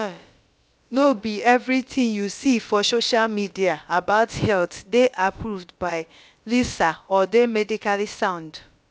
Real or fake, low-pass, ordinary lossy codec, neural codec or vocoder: fake; none; none; codec, 16 kHz, about 1 kbps, DyCAST, with the encoder's durations